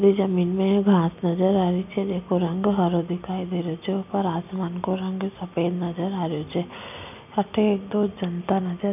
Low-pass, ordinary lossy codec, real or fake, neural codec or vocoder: 3.6 kHz; none; real; none